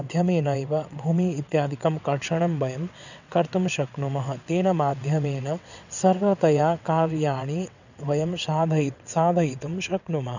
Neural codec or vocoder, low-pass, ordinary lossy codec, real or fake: vocoder, 22.05 kHz, 80 mel bands, WaveNeXt; 7.2 kHz; none; fake